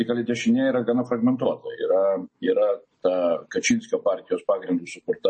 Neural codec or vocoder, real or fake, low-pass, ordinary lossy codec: none; real; 10.8 kHz; MP3, 32 kbps